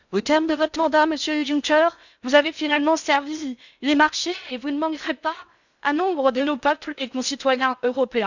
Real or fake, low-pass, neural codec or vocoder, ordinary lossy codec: fake; 7.2 kHz; codec, 16 kHz in and 24 kHz out, 0.6 kbps, FocalCodec, streaming, 2048 codes; none